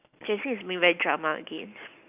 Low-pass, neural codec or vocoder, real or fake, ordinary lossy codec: 3.6 kHz; none; real; none